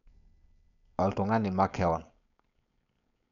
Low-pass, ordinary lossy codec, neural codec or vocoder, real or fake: 7.2 kHz; MP3, 96 kbps; codec, 16 kHz, 4.8 kbps, FACodec; fake